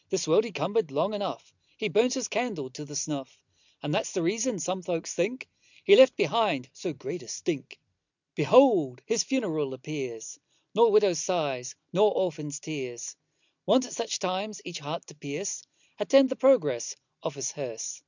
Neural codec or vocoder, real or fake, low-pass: none; real; 7.2 kHz